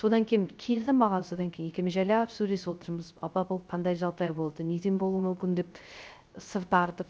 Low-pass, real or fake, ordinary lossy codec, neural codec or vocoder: none; fake; none; codec, 16 kHz, 0.3 kbps, FocalCodec